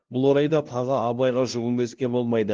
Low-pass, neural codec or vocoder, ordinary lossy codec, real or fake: 7.2 kHz; codec, 16 kHz, 0.5 kbps, FunCodec, trained on LibriTTS, 25 frames a second; Opus, 16 kbps; fake